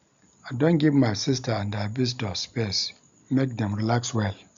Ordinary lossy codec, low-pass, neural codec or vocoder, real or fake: MP3, 64 kbps; 7.2 kHz; none; real